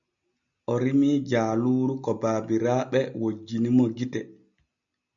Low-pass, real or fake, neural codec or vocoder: 7.2 kHz; real; none